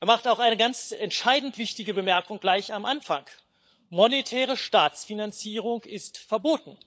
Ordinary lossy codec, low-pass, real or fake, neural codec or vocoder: none; none; fake; codec, 16 kHz, 16 kbps, FunCodec, trained on LibriTTS, 50 frames a second